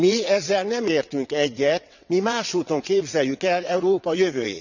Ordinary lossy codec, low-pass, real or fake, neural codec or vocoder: none; 7.2 kHz; fake; vocoder, 22.05 kHz, 80 mel bands, WaveNeXt